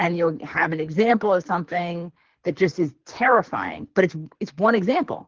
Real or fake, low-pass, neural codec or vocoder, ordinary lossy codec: fake; 7.2 kHz; codec, 24 kHz, 3 kbps, HILCodec; Opus, 16 kbps